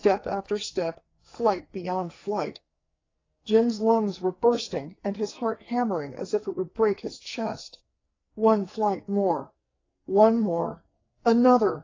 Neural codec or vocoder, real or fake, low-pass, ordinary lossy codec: codec, 44.1 kHz, 2.6 kbps, SNAC; fake; 7.2 kHz; AAC, 32 kbps